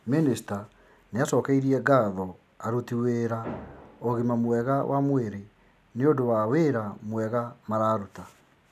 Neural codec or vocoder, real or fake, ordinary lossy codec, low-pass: none; real; none; 14.4 kHz